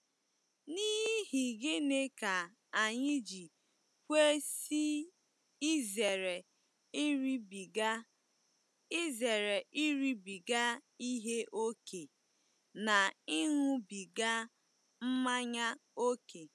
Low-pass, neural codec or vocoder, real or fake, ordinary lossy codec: none; none; real; none